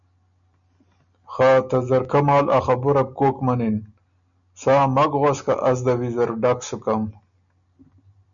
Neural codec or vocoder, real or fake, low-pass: none; real; 7.2 kHz